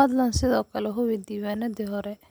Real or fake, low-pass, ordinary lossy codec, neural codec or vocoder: real; none; none; none